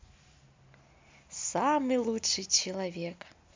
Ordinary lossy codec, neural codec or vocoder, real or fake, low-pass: MP3, 64 kbps; none; real; 7.2 kHz